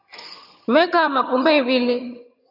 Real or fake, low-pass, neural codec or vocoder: fake; 5.4 kHz; vocoder, 22.05 kHz, 80 mel bands, HiFi-GAN